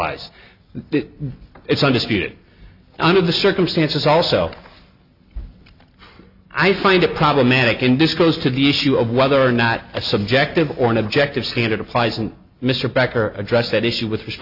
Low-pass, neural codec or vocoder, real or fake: 5.4 kHz; none; real